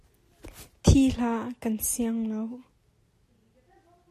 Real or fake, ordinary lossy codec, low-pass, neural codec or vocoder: real; AAC, 64 kbps; 14.4 kHz; none